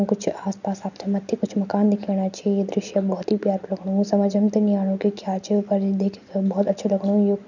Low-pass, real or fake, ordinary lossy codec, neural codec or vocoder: 7.2 kHz; real; none; none